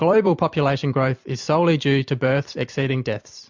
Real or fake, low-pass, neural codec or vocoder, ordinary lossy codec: fake; 7.2 kHz; vocoder, 44.1 kHz, 128 mel bands every 256 samples, BigVGAN v2; MP3, 64 kbps